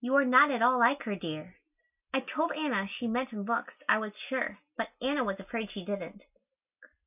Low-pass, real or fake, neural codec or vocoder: 3.6 kHz; real; none